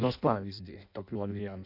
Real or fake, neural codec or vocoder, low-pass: fake; codec, 16 kHz in and 24 kHz out, 0.6 kbps, FireRedTTS-2 codec; 5.4 kHz